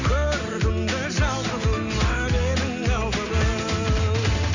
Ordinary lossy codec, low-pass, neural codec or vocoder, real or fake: none; 7.2 kHz; none; real